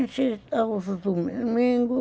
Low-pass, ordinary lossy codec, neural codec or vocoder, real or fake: none; none; none; real